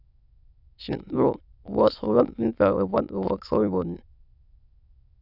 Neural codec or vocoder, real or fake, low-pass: autoencoder, 22.05 kHz, a latent of 192 numbers a frame, VITS, trained on many speakers; fake; 5.4 kHz